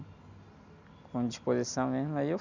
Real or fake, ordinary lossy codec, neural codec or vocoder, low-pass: real; none; none; 7.2 kHz